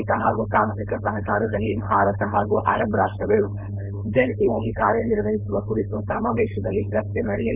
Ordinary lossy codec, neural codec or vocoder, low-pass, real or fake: none; codec, 16 kHz, 4.8 kbps, FACodec; 3.6 kHz; fake